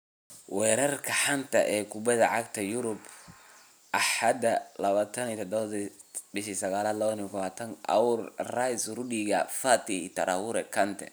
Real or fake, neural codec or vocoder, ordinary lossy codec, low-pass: real; none; none; none